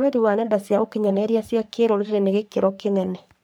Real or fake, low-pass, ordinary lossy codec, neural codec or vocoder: fake; none; none; codec, 44.1 kHz, 3.4 kbps, Pupu-Codec